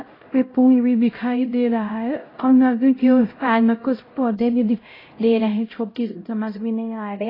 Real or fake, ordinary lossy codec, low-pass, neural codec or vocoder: fake; AAC, 24 kbps; 5.4 kHz; codec, 16 kHz, 0.5 kbps, X-Codec, HuBERT features, trained on LibriSpeech